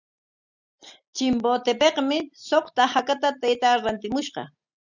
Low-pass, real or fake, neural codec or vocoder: 7.2 kHz; real; none